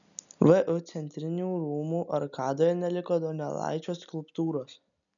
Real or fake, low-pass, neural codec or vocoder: real; 7.2 kHz; none